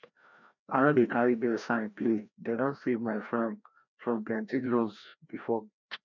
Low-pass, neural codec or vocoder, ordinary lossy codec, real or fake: 7.2 kHz; codec, 16 kHz, 1 kbps, FreqCodec, larger model; none; fake